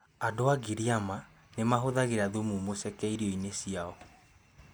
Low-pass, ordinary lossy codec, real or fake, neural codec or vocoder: none; none; real; none